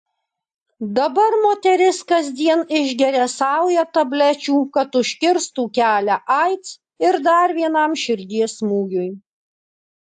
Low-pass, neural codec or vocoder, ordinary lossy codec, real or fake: 10.8 kHz; none; AAC, 64 kbps; real